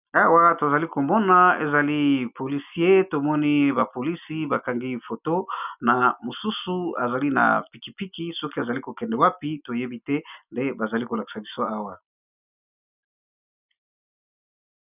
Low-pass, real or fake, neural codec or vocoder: 3.6 kHz; real; none